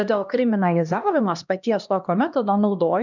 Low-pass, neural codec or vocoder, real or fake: 7.2 kHz; codec, 16 kHz, 1 kbps, X-Codec, HuBERT features, trained on LibriSpeech; fake